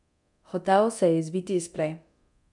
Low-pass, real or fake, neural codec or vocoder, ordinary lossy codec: 10.8 kHz; fake; codec, 24 kHz, 0.9 kbps, DualCodec; none